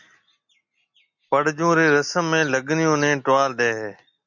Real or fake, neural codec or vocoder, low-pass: real; none; 7.2 kHz